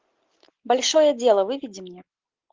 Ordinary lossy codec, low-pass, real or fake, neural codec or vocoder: Opus, 32 kbps; 7.2 kHz; real; none